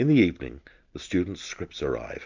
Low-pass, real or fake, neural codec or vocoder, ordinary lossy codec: 7.2 kHz; real; none; MP3, 64 kbps